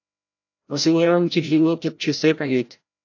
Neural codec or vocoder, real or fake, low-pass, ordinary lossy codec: codec, 16 kHz, 0.5 kbps, FreqCodec, larger model; fake; 7.2 kHz; AAC, 48 kbps